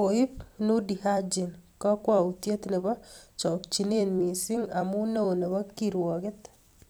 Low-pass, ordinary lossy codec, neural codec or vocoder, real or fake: none; none; vocoder, 44.1 kHz, 128 mel bands every 256 samples, BigVGAN v2; fake